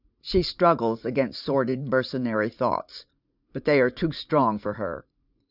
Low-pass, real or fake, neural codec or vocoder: 5.4 kHz; fake; vocoder, 44.1 kHz, 80 mel bands, Vocos